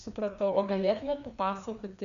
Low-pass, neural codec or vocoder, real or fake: 7.2 kHz; codec, 16 kHz, 2 kbps, FreqCodec, larger model; fake